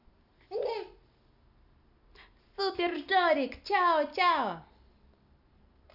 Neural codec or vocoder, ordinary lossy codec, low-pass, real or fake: none; none; 5.4 kHz; real